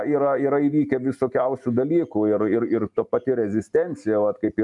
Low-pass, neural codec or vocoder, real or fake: 10.8 kHz; none; real